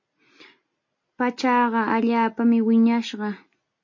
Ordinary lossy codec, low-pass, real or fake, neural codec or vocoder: MP3, 32 kbps; 7.2 kHz; real; none